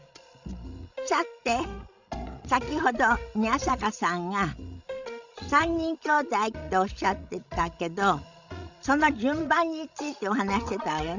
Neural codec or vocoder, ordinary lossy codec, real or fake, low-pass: codec, 16 kHz, 16 kbps, FreqCodec, larger model; Opus, 64 kbps; fake; 7.2 kHz